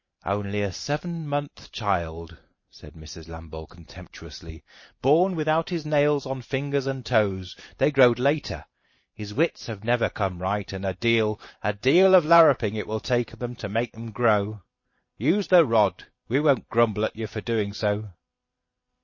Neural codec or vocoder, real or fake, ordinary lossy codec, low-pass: vocoder, 44.1 kHz, 128 mel bands every 512 samples, BigVGAN v2; fake; MP3, 32 kbps; 7.2 kHz